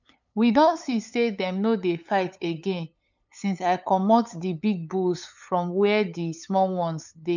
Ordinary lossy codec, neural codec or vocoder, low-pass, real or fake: none; codec, 16 kHz, 8 kbps, FunCodec, trained on LibriTTS, 25 frames a second; 7.2 kHz; fake